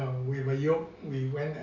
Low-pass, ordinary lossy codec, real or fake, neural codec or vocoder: 7.2 kHz; none; real; none